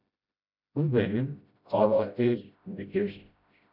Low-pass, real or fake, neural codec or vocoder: 5.4 kHz; fake; codec, 16 kHz, 0.5 kbps, FreqCodec, smaller model